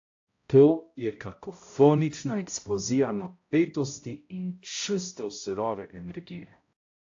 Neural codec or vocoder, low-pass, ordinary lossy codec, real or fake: codec, 16 kHz, 0.5 kbps, X-Codec, HuBERT features, trained on balanced general audio; 7.2 kHz; AAC, 48 kbps; fake